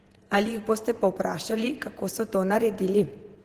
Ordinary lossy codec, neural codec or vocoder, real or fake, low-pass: Opus, 16 kbps; vocoder, 48 kHz, 128 mel bands, Vocos; fake; 14.4 kHz